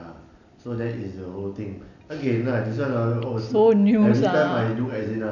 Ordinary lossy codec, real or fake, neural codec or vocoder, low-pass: none; real; none; 7.2 kHz